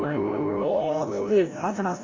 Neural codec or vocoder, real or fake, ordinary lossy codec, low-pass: codec, 16 kHz, 0.5 kbps, FreqCodec, larger model; fake; AAC, 32 kbps; 7.2 kHz